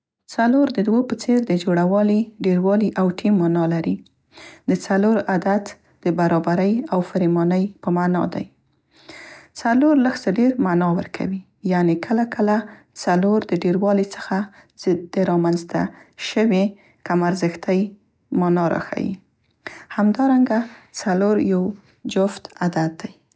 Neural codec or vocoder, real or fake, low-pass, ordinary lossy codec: none; real; none; none